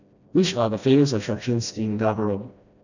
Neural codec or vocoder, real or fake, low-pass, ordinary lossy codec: codec, 16 kHz, 1 kbps, FreqCodec, smaller model; fake; 7.2 kHz; none